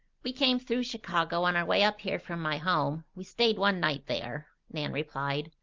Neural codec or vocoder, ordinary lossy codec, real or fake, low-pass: none; Opus, 24 kbps; real; 7.2 kHz